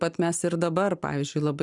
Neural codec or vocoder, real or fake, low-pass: none; real; 10.8 kHz